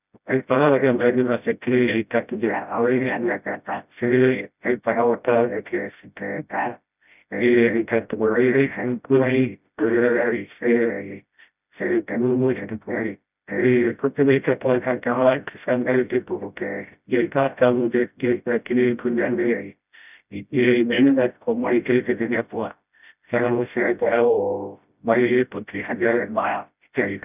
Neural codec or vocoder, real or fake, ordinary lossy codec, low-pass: codec, 16 kHz, 0.5 kbps, FreqCodec, smaller model; fake; none; 3.6 kHz